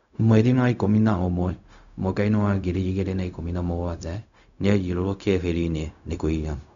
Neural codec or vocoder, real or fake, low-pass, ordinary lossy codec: codec, 16 kHz, 0.4 kbps, LongCat-Audio-Codec; fake; 7.2 kHz; none